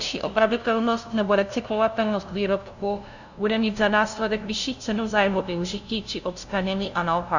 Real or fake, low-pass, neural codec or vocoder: fake; 7.2 kHz; codec, 16 kHz, 0.5 kbps, FunCodec, trained on LibriTTS, 25 frames a second